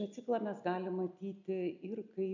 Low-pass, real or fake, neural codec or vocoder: 7.2 kHz; real; none